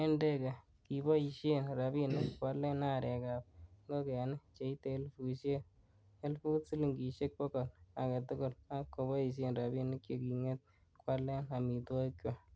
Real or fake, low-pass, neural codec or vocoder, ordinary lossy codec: real; none; none; none